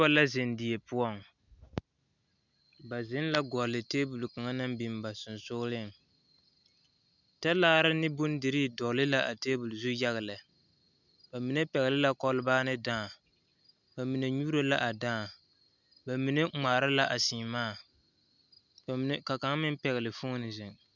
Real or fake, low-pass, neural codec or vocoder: real; 7.2 kHz; none